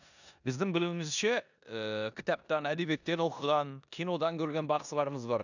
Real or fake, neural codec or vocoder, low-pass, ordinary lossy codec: fake; codec, 16 kHz in and 24 kHz out, 0.9 kbps, LongCat-Audio-Codec, four codebook decoder; 7.2 kHz; none